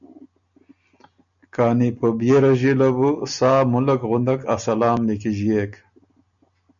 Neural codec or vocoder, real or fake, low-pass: none; real; 7.2 kHz